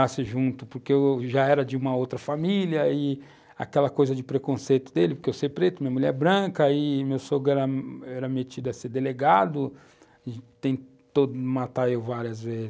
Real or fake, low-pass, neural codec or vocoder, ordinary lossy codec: real; none; none; none